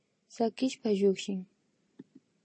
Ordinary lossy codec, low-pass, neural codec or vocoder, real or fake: MP3, 32 kbps; 9.9 kHz; none; real